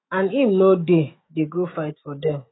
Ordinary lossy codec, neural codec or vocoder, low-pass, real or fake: AAC, 16 kbps; autoencoder, 48 kHz, 128 numbers a frame, DAC-VAE, trained on Japanese speech; 7.2 kHz; fake